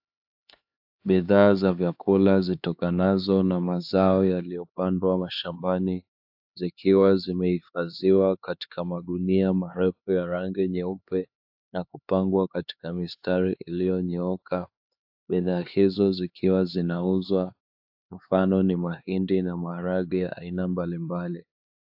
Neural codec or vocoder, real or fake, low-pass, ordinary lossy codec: codec, 16 kHz, 4 kbps, X-Codec, HuBERT features, trained on LibriSpeech; fake; 5.4 kHz; AAC, 48 kbps